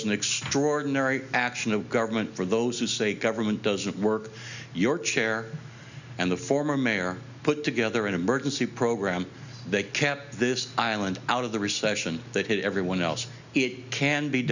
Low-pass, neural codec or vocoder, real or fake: 7.2 kHz; none; real